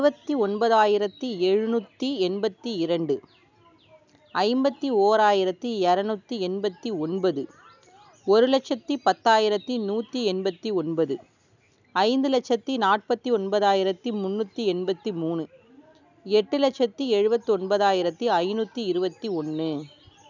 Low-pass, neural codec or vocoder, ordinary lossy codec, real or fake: 7.2 kHz; none; none; real